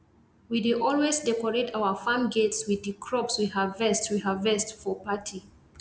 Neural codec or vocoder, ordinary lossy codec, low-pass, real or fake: none; none; none; real